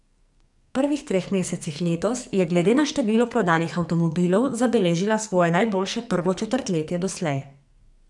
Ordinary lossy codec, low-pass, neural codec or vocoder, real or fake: none; 10.8 kHz; codec, 44.1 kHz, 2.6 kbps, SNAC; fake